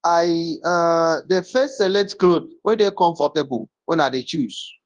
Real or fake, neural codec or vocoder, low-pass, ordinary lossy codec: fake; codec, 24 kHz, 0.9 kbps, WavTokenizer, large speech release; 10.8 kHz; Opus, 32 kbps